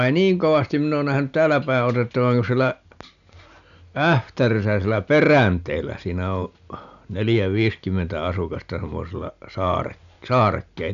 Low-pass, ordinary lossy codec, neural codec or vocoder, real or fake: 7.2 kHz; none; none; real